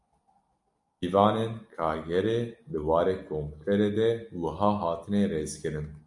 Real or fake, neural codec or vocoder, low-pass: real; none; 10.8 kHz